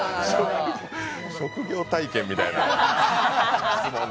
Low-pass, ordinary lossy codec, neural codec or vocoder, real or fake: none; none; none; real